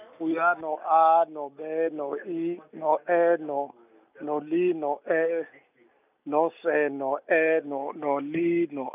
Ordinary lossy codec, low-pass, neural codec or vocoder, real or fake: none; 3.6 kHz; none; real